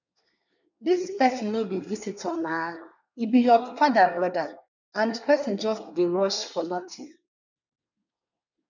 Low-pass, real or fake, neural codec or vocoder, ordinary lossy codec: 7.2 kHz; fake; codec, 24 kHz, 1 kbps, SNAC; none